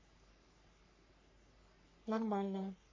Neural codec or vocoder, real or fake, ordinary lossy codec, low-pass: codec, 44.1 kHz, 3.4 kbps, Pupu-Codec; fake; MP3, 32 kbps; 7.2 kHz